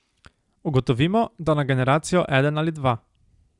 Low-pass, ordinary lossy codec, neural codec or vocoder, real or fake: 10.8 kHz; Opus, 64 kbps; none; real